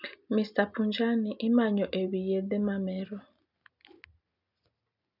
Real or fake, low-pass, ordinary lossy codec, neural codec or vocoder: real; 5.4 kHz; none; none